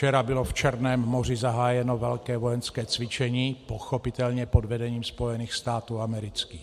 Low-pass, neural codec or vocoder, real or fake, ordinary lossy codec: 14.4 kHz; none; real; MP3, 64 kbps